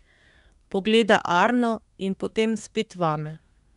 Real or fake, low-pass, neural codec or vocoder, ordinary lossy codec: fake; 10.8 kHz; codec, 24 kHz, 1 kbps, SNAC; none